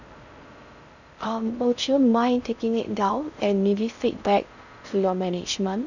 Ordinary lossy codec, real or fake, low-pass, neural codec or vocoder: none; fake; 7.2 kHz; codec, 16 kHz in and 24 kHz out, 0.6 kbps, FocalCodec, streaming, 4096 codes